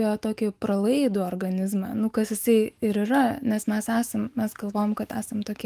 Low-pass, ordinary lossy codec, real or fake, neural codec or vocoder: 14.4 kHz; Opus, 24 kbps; fake; autoencoder, 48 kHz, 128 numbers a frame, DAC-VAE, trained on Japanese speech